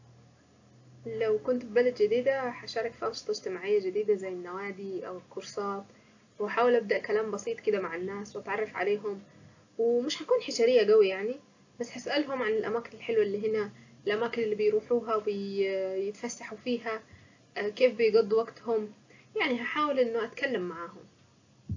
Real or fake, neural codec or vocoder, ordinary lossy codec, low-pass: real; none; AAC, 48 kbps; 7.2 kHz